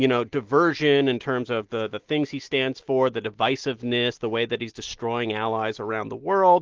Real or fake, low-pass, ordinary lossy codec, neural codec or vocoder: real; 7.2 kHz; Opus, 16 kbps; none